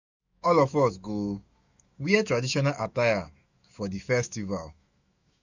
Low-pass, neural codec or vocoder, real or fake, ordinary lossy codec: 7.2 kHz; autoencoder, 48 kHz, 128 numbers a frame, DAC-VAE, trained on Japanese speech; fake; none